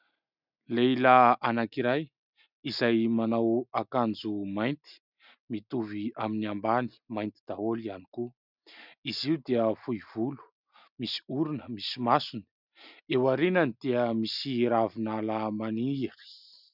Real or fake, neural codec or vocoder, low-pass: real; none; 5.4 kHz